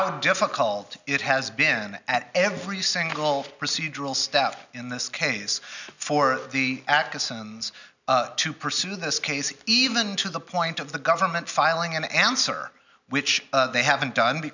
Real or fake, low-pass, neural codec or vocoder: real; 7.2 kHz; none